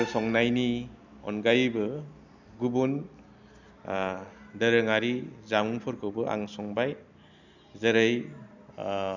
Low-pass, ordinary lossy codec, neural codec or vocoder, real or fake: 7.2 kHz; none; none; real